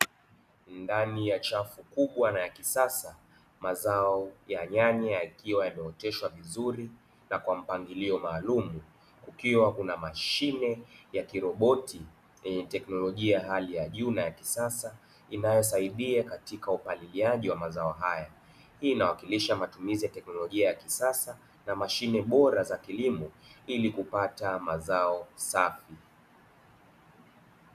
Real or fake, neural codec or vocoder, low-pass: real; none; 14.4 kHz